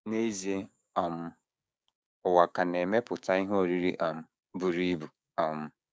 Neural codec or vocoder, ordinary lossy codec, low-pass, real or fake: codec, 16 kHz, 6 kbps, DAC; none; none; fake